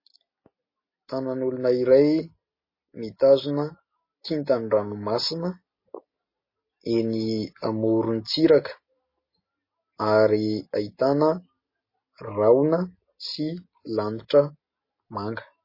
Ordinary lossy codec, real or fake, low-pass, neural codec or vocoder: MP3, 24 kbps; real; 5.4 kHz; none